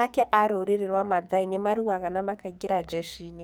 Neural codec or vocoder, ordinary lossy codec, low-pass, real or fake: codec, 44.1 kHz, 2.6 kbps, SNAC; none; none; fake